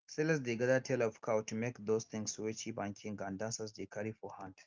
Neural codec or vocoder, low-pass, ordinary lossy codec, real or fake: none; 7.2 kHz; Opus, 24 kbps; real